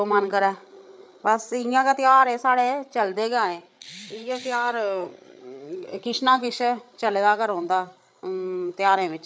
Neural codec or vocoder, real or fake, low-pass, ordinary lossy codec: codec, 16 kHz, 8 kbps, FreqCodec, larger model; fake; none; none